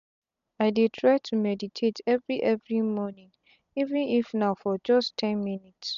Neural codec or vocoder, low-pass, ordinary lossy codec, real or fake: none; 7.2 kHz; none; real